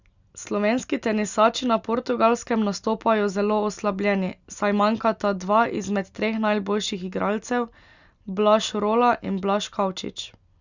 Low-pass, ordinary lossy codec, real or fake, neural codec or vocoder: 7.2 kHz; Opus, 64 kbps; real; none